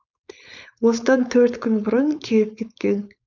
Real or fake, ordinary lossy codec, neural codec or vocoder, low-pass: fake; none; codec, 16 kHz, 4.8 kbps, FACodec; 7.2 kHz